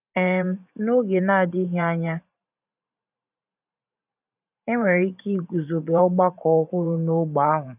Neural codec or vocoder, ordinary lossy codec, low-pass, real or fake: vocoder, 44.1 kHz, 128 mel bands every 512 samples, BigVGAN v2; none; 3.6 kHz; fake